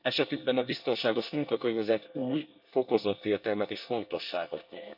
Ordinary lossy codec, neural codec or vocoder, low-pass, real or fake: none; codec, 24 kHz, 1 kbps, SNAC; 5.4 kHz; fake